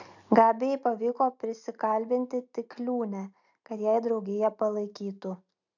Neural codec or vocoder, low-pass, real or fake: none; 7.2 kHz; real